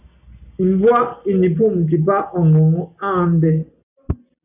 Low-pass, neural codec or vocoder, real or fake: 3.6 kHz; none; real